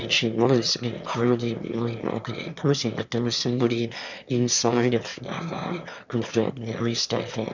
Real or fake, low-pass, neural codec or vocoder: fake; 7.2 kHz; autoencoder, 22.05 kHz, a latent of 192 numbers a frame, VITS, trained on one speaker